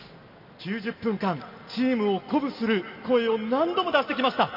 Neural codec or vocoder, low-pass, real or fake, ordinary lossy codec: none; 5.4 kHz; real; none